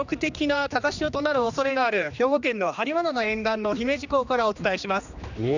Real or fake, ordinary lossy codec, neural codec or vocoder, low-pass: fake; none; codec, 16 kHz, 2 kbps, X-Codec, HuBERT features, trained on general audio; 7.2 kHz